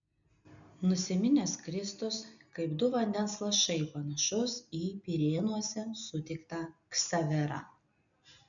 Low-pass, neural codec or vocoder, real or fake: 7.2 kHz; none; real